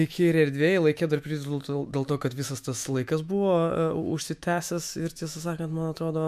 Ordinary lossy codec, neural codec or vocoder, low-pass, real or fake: MP3, 96 kbps; autoencoder, 48 kHz, 128 numbers a frame, DAC-VAE, trained on Japanese speech; 14.4 kHz; fake